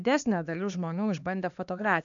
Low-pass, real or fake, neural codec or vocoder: 7.2 kHz; fake; codec, 16 kHz, 2 kbps, X-Codec, HuBERT features, trained on LibriSpeech